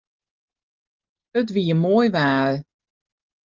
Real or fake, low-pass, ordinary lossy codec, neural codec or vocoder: real; 7.2 kHz; Opus, 24 kbps; none